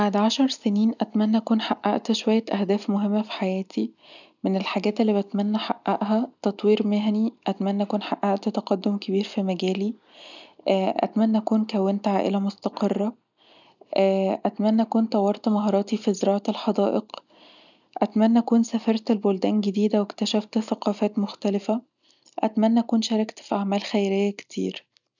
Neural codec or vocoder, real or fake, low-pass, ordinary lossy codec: none; real; 7.2 kHz; none